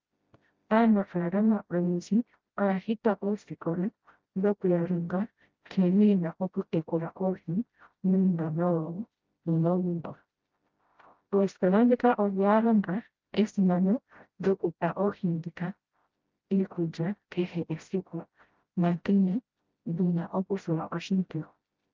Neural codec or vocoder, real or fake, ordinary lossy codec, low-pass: codec, 16 kHz, 0.5 kbps, FreqCodec, smaller model; fake; Opus, 32 kbps; 7.2 kHz